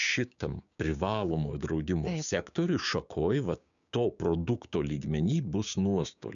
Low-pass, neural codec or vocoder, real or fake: 7.2 kHz; codec, 16 kHz, 6 kbps, DAC; fake